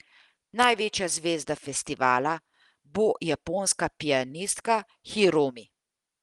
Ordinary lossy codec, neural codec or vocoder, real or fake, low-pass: Opus, 24 kbps; none; real; 10.8 kHz